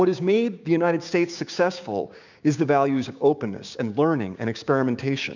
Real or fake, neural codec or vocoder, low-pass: fake; codec, 16 kHz, 6 kbps, DAC; 7.2 kHz